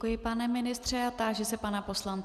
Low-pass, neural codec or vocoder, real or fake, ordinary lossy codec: 14.4 kHz; none; real; Opus, 64 kbps